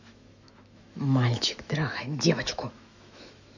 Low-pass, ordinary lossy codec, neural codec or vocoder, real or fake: 7.2 kHz; none; autoencoder, 48 kHz, 128 numbers a frame, DAC-VAE, trained on Japanese speech; fake